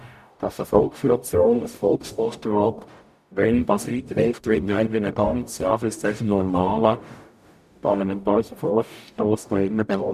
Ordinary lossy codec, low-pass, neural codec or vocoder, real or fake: none; 14.4 kHz; codec, 44.1 kHz, 0.9 kbps, DAC; fake